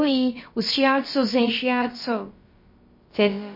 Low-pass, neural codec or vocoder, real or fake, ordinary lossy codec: 5.4 kHz; codec, 16 kHz, about 1 kbps, DyCAST, with the encoder's durations; fake; MP3, 24 kbps